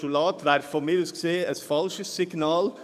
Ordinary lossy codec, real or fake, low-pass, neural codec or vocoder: none; fake; 14.4 kHz; codec, 44.1 kHz, 7.8 kbps, DAC